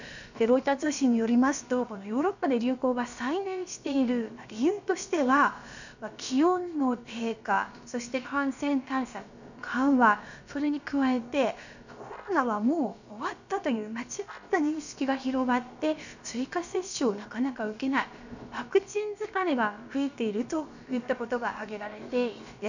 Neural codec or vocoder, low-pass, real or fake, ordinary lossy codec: codec, 16 kHz, about 1 kbps, DyCAST, with the encoder's durations; 7.2 kHz; fake; none